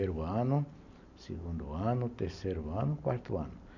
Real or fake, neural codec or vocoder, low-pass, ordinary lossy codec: real; none; 7.2 kHz; none